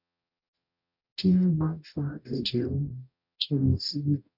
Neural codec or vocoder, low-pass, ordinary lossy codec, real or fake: codec, 44.1 kHz, 0.9 kbps, DAC; 5.4 kHz; none; fake